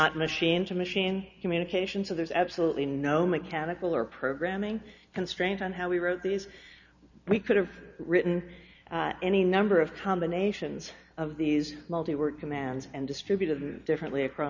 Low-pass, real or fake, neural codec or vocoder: 7.2 kHz; real; none